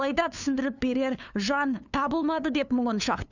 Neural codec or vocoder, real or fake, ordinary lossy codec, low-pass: codec, 16 kHz, 2 kbps, FunCodec, trained on LibriTTS, 25 frames a second; fake; none; 7.2 kHz